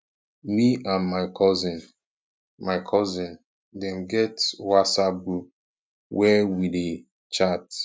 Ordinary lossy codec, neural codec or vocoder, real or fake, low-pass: none; none; real; none